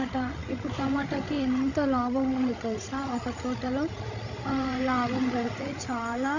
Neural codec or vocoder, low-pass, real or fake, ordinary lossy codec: codec, 16 kHz, 16 kbps, FreqCodec, larger model; 7.2 kHz; fake; none